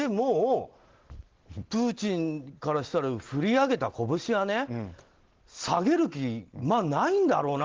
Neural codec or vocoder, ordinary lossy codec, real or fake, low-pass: none; Opus, 16 kbps; real; 7.2 kHz